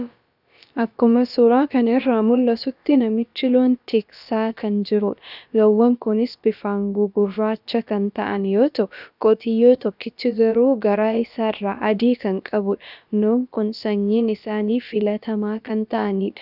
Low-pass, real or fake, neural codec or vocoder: 5.4 kHz; fake; codec, 16 kHz, about 1 kbps, DyCAST, with the encoder's durations